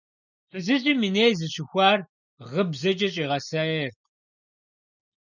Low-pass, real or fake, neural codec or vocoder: 7.2 kHz; real; none